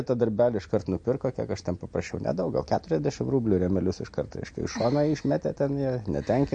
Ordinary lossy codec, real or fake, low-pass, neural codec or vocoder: MP3, 48 kbps; real; 9.9 kHz; none